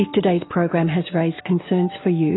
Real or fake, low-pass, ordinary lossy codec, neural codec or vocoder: real; 7.2 kHz; AAC, 16 kbps; none